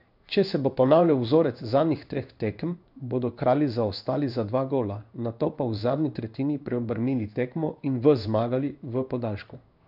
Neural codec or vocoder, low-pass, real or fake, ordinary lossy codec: codec, 16 kHz in and 24 kHz out, 1 kbps, XY-Tokenizer; 5.4 kHz; fake; none